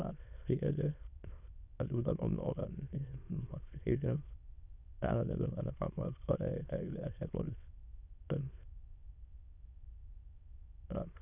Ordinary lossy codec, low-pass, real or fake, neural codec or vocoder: Opus, 64 kbps; 3.6 kHz; fake; autoencoder, 22.05 kHz, a latent of 192 numbers a frame, VITS, trained on many speakers